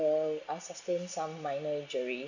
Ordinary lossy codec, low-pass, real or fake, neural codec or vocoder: none; 7.2 kHz; real; none